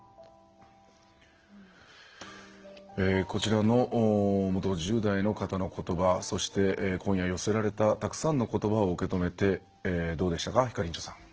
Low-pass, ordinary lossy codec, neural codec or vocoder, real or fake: 7.2 kHz; Opus, 16 kbps; none; real